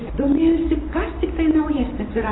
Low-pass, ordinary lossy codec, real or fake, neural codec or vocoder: 7.2 kHz; AAC, 16 kbps; fake; vocoder, 44.1 kHz, 128 mel bands every 512 samples, BigVGAN v2